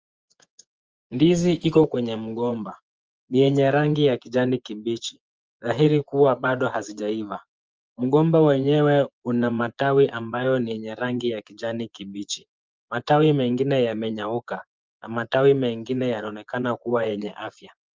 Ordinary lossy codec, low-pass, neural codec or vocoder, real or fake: Opus, 24 kbps; 7.2 kHz; vocoder, 24 kHz, 100 mel bands, Vocos; fake